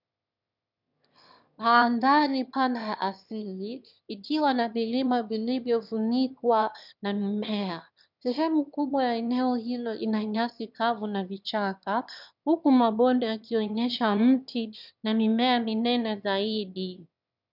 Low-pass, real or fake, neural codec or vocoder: 5.4 kHz; fake; autoencoder, 22.05 kHz, a latent of 192 numbers a frame, VITS, trained on one speaker